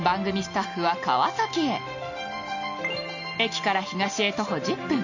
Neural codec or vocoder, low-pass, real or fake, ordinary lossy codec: none; 7.2 kHz; real; none